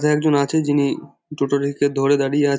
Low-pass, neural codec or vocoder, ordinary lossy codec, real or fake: none; none; none; real